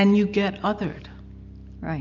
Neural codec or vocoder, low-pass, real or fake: none; 7.2 kHz; real